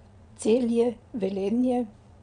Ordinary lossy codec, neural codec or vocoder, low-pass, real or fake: none; vocoder, 22.05 kHz, 80 mel bands, Vocos; 9.9 kHz; fake